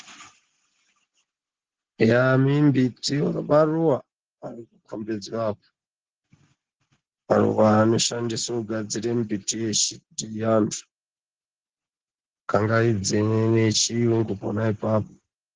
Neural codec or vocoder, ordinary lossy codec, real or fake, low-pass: none; Opus, 16 kbps; real; 7.2 kHz